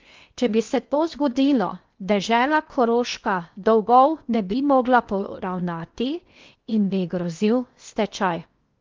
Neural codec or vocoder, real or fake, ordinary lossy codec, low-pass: codec, 16 kHz in and 24 kHz out, 0.8 kbps, FocalCodec, streaming, 65536 codes; fake; Opus, 32 kbps; 7.2 kHz